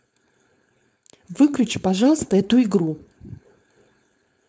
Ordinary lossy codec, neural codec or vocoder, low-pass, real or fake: none; codec, 16 kHz, 4.8 kbps, FACodec; none; fake